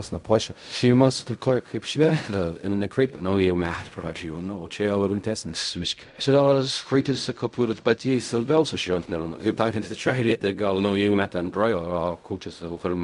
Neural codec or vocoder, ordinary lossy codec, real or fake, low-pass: codec, 16 kHz in and 24 kHz out, 0.4 kbps, LongCat-Audio-Codec, fine tuned four codebook decoder; AAC, 96 kbps; fake; 10.8 kHz